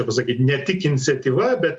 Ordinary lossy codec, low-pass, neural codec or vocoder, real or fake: Opus, 64 kbps; 10.8 kHz; none; real